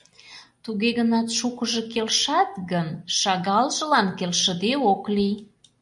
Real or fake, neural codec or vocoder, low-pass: real; none; 10.8 kHz